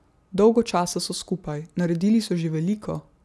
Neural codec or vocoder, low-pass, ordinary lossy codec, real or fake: none; none; none; real